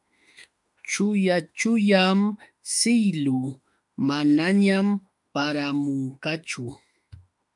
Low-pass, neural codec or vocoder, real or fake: 10.8 kHz; autoencoder, 48 kHz, 32 numbers a frame, DAC-VAE, trained on Japanese speech; fake